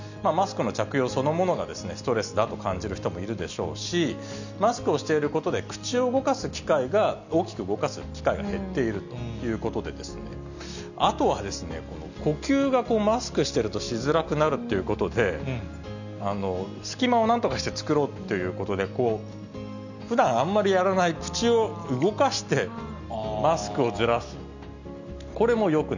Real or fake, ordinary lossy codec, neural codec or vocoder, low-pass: real; none; none; 7.2 kHz